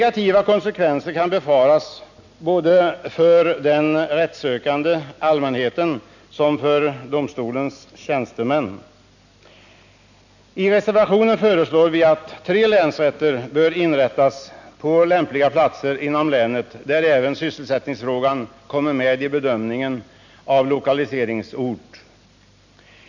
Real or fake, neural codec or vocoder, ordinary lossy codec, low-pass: real; none; none; 7.2 kHz